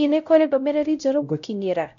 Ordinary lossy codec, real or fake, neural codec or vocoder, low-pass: none; fake; codec, 16 kHz, 0.5 kbps, X-Codec, WavLM features, trained on Multilingual LibriSpeech; 7.2 kHz